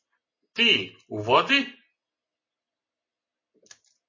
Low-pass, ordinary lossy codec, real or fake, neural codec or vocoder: 7.2 kHz; MP3, 32 kbps; real; none